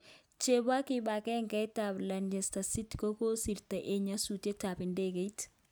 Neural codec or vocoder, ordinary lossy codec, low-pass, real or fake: none; none; none; real